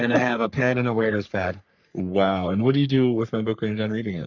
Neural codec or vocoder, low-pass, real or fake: codec, 44.1 kHz, 3.4 kbps, Pupu-Codec; 7.2 kHz; fake